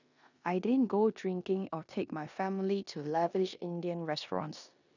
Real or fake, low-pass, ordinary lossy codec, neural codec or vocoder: fake; 7.2 kHz; none; codec, 16 kHz in and 24 kHz out, 0.9 kbps, LongCat-Audio-Codec, four codebook decoder